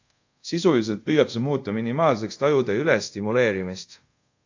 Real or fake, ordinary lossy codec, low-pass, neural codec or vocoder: fake; AAC, 48 kbps; 7.2 kHz; codec, 24 kHz, 0.5 kbps, DualCodec